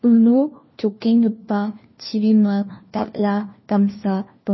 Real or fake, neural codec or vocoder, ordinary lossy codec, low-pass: fake; codec, 16 kHz, 1 kbps, FunCodec, trained on LibriTTS, 50 frames a second; MP3, 24 kbps; 7.2 kHz